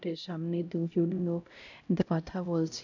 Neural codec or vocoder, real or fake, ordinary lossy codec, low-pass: codec, 16 kHz, 0.5 kbps, X-Codec, HuBERT features, trained on LibriSpeech; fake; none; 7.2 kHz